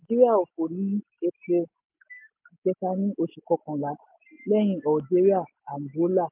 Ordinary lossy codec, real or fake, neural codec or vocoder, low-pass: MP3, 32 kbps; real; none; 3.6 kHz